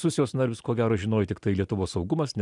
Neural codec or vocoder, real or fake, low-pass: none; real; 10.8 kHz